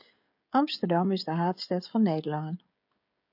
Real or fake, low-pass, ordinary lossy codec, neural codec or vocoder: fake; 5.4 kHz; AAC, 48 kbps; codec, 16 kHz, 16 kbps, FreqCodec, smaller model